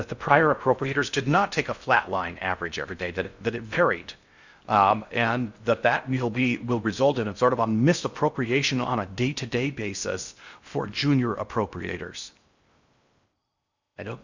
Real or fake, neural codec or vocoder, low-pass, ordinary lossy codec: fake; codec, 16 kHz in and 24 kHz out, 0.6 kbps, FocalCodec, streaming, 2048 codes; 7.2 kHz; Opus, 64 kbps